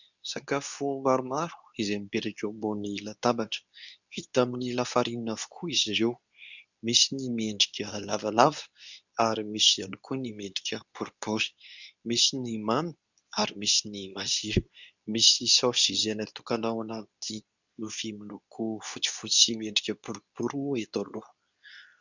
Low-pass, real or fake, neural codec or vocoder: 7.2 kHz; fake; codec, 24 kHz, 0.9 kbps, WavTokenizer, medium speech release version 2